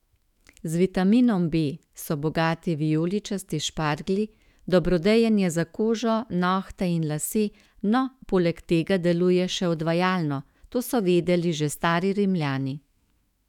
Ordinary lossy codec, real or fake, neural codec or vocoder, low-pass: none; fake; autoencoder, 48 kHz, 128 numbers a frame, DAC-VAE, trained on Japanese speech; 19.8 kHz